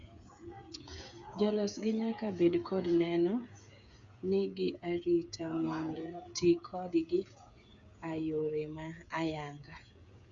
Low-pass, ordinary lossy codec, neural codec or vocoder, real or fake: 7.2 kHz; Opus, 64 kbps; codec, 16 kHz, 8 kbps, FreqCodec, smaller model; fake